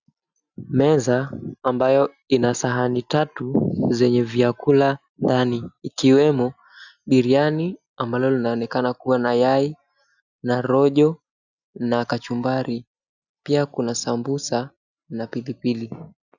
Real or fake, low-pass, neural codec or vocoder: real; 7.2 kHz; none